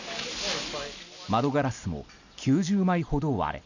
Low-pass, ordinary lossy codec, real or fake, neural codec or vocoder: 7.2 kHz; none; real; none